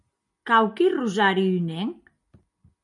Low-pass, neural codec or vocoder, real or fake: 10.8 kHz; none; real